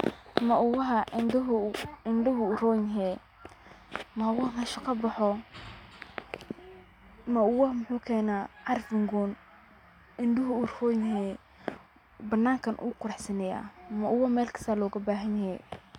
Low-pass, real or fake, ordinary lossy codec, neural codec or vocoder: 19.8 kHz; real; none; none